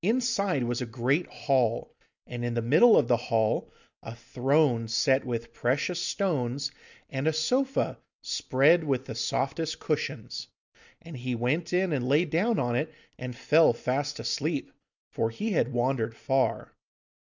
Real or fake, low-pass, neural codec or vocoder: real; 7.2 kHz; none